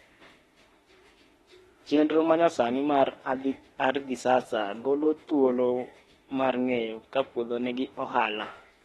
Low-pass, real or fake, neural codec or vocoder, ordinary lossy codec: 19.8 kHz; fake; autoencoder, 48 kHz, 32 numbers a frame, DAC-VAE, trained on Japanese speech; AAC, 32 kbps